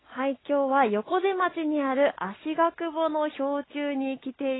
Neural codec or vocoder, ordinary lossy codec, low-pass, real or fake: none; AAC, 16 kbps; 7.2 kHz; real